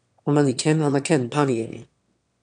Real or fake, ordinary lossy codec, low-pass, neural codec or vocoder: fake; MP3, 96 kbps; 9.9 kHz; autoencoder, 22.05 kHz, a latent of 192 numbers a frame, VITS, trained on one speaker